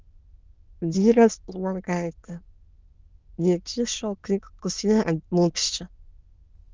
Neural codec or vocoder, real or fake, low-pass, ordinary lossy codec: autoencoder, 22.05 kHz, a latent of 192 numbers a frame, VITS, trained on many speakers; fake; 7.2 kHz; Opus, 24 kbps